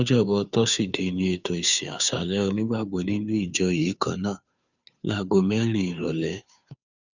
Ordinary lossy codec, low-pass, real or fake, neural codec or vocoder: none; 7.2 kHz; fake; codec, 16 kHz, 2 kbps, FunCodec, trained on Chinese and English, 25 frames a second